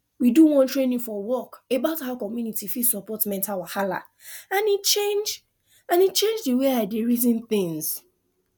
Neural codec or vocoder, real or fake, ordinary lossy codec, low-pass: none; real; none; 19.8 kHz